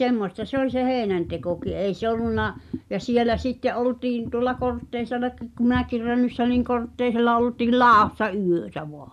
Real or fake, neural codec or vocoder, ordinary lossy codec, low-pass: real; none; none; 14.4 kHz